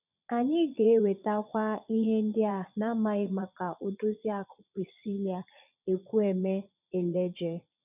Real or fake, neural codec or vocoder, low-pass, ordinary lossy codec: fake; vocoder, 22.05 kHz, 80 mel bands, Vocos; 3.6 kHz; none